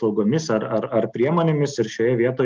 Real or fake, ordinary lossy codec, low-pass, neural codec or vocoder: real; Opus, 24 kbps; 7.2 kHz; none